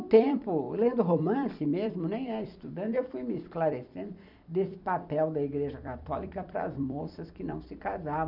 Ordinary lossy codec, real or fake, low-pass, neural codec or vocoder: none; fake; 5.4 kHz; vocoder, 44.1 kHz, 128 mel bands every 256 samples, BigVGAN v2